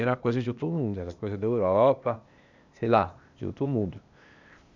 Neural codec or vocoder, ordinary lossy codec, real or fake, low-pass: codec, 16 kHz, 0.8 kbps, ZipCodec; none; fake; 7.2 kHz